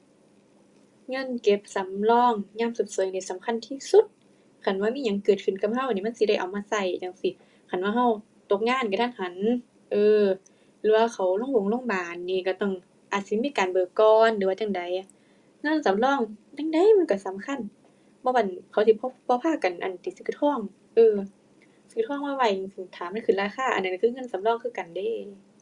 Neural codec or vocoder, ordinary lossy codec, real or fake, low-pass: none; Opus, 64 kbps; real; 10.8 kHz